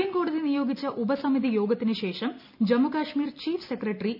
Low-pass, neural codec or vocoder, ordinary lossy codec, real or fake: 5.4 kHz; none; none; real